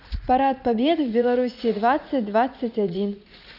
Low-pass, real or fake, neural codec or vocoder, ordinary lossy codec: 5.4 kHz; fake; vocoder, 44.1 kHz, 80 mel bands, Vocos; none